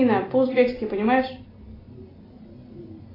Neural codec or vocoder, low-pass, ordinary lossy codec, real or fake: none; 5.4 kHz; AAC, 24 kbps; real